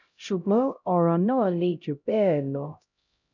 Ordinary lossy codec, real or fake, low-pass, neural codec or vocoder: none; fake; 7.2 kHz; codec, 16 kHz, 0.5 kbps, X-Codec, HuBERT features, trained on LibriSpeech